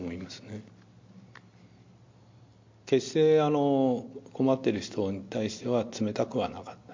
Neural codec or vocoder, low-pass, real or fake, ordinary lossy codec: none; 7.2 kHz; real; MP3, 48 kbps